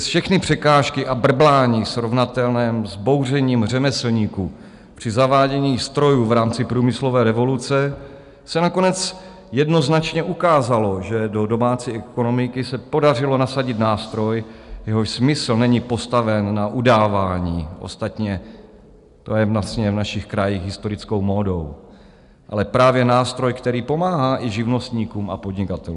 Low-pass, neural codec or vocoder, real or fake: 10.8 kHz; none; real